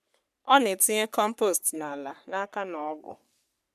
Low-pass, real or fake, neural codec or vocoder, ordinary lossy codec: 14.4 kHz; fake; codec, 44.1 kHz, 3.4 kbps, Pupu-Codec; none